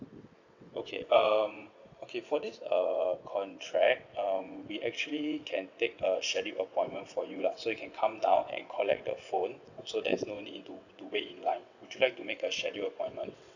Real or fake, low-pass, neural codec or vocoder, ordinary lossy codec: fake; 7.2 kHz; vocoder, 22.05 kHz, 80 mel bands, WaveNeXt; none